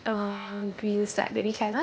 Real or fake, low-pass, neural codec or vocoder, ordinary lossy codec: fake; none; codec, 16 kHz, 0.8 kbps, ZipCodec; none